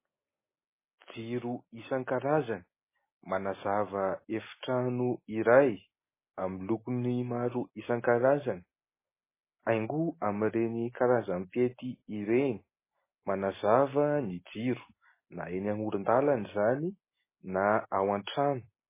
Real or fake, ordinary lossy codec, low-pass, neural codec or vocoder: real; MP3, 16 kbps; 3.6 kHz; none